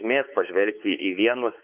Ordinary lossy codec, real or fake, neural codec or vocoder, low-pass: Opus, 32 kbps; fake; codec, 16 kHz, 4 kbps, X-Codec, WavLM features, trained on Multilingual LibriSpeech; 3.6 kHz